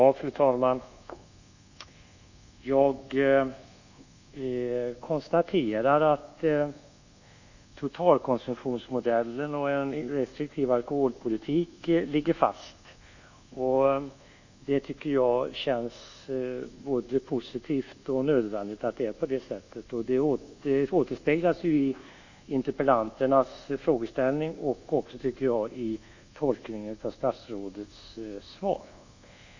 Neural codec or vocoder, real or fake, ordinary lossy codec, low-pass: codec, 24 kHz, 1.2 kbps, DualCodec; fake; Opus, 64 kbps; 7.2 kHz